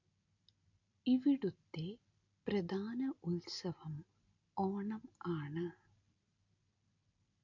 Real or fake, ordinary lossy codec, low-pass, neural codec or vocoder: real; AAC, 48 kbps; 7.2 kHz; none